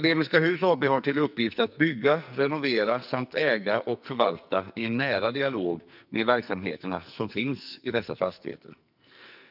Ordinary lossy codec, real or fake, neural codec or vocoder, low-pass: none; fake; codec, 44.1 kHz, 2.6 kbps, SNAC; 5.4 kHz